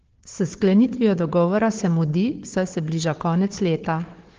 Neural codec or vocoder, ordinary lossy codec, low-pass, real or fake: codec, 16 kHz, 4 kbps, FunCodec, trained on Chinese and English, 50 frames a second; Opus, 16 kbps; 7.2 kHz; fake